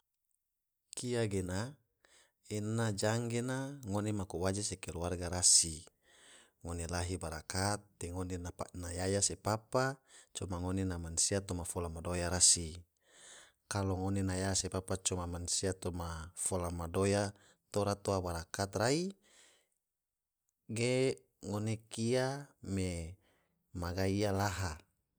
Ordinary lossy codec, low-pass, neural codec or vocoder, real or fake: none; none; none; real